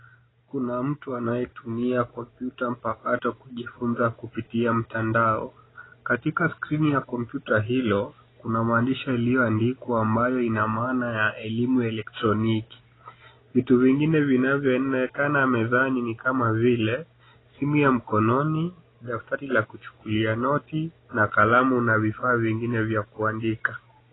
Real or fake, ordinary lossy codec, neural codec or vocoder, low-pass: real; AAC, 16 kbps; none; 7.2 kHz